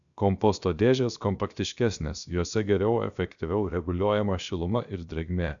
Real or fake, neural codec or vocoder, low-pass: fake; codec, 16 kHz, 0.7 kbps, FocalCodec; 7.2 kHz